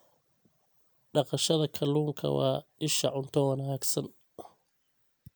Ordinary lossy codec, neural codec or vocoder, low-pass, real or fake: none; none; none; real